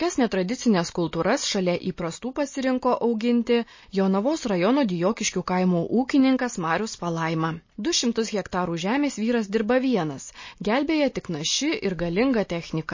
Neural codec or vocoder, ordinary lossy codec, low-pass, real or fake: none; MP3, 32 kbps; 7.2 kHz; real